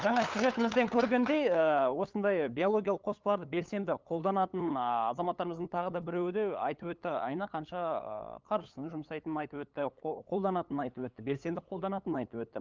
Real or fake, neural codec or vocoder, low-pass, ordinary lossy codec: fake; codec, 16 kHz, 8 kbps, FunCodec, trained on LibriTTS, 25 frames a second; 7.2 kHz; Opus, 32 kbps